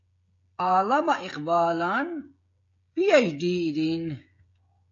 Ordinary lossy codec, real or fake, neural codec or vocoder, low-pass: AAC, 48 kbps; fake; codec, 16 kHz, 16 kbps, FreqCodec, smaller model; 7.2 kHz